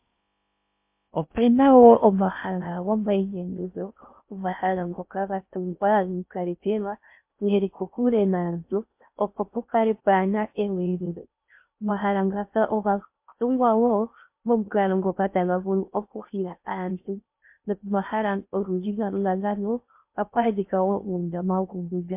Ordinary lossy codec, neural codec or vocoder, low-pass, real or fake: MP3, 32 kbps; codec, 16 kHz in and 24 kHz out, 0.6 kbps, FocalCodec, streaming, 4096 codes; 3.6 kHz; fake